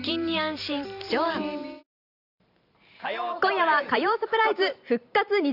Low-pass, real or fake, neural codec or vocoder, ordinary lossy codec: 5.4 kHz; fake; vocoder, 44.1 kHz, 128 mel bands every 512 samples, BigVGAN v2; none